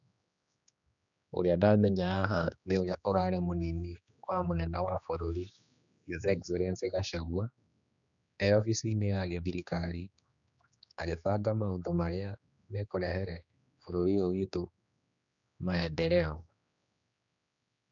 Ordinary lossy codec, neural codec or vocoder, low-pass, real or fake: none; codec, 16 kHz, 2 kbps, X-Codec, HuBERT features, trained on general audio; 7.2 kHz; fake